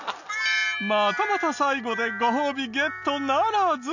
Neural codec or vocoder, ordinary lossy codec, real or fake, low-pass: none; none; real; 7.2 kHz